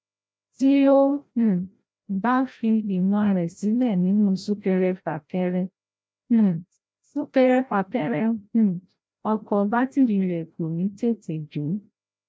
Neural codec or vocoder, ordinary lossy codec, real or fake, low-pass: codec, 16 kHz, 0.5 kbps, FreqCodec, larger model; none; fake; none